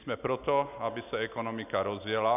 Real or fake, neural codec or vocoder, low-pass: real; none; 3.6 kHz